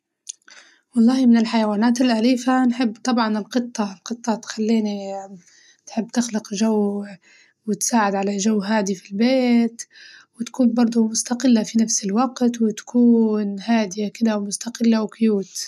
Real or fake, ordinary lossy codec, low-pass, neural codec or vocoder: real; none; 14.4 kHz; none